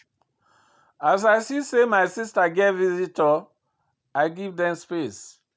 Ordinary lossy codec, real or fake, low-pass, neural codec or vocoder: none; real; none; none